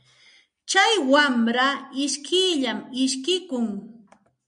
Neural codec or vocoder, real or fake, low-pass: none; real; 9.9 kHz